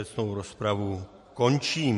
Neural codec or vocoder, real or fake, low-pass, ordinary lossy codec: vocoder, 44.1 kHz, 128 mel bands every 512 samples, BigVGAN v2; fake; 14.4 kHz; MP3, 48 kbps